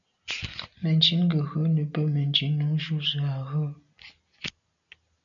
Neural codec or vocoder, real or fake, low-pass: none; real; 7.2 kHz